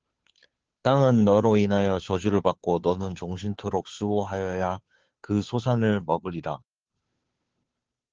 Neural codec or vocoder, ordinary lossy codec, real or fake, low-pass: codec, 16 kHz, 2 kbps, FunCodec, trained on Chinese and English, 25 frames a second; Opus, 16 kbps; fake; 7.2 kHz